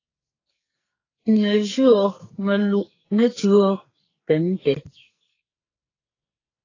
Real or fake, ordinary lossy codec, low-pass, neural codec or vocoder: fake; AAC, 32 kbps; 7.2 kHz; codec, 44.1 kHz, 2.6 kbps, SNAC